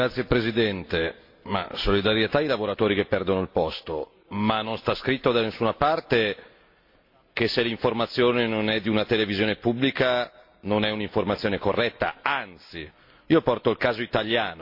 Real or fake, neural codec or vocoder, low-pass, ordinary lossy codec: real; none; 5.4 kHz; MP3, 32 kbps